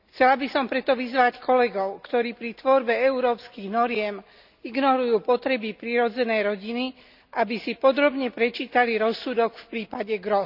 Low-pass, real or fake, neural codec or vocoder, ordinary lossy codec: 5.4 kHz; real; none; none